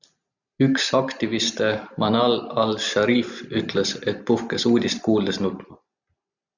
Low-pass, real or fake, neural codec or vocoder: 7.2 kHz; real; none